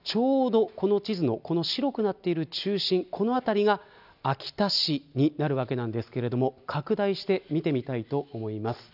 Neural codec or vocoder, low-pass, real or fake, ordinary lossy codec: none; 5.4 kHz; real; none